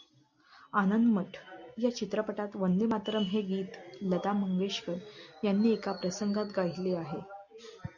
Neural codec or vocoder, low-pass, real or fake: none; 7.2 kHz; real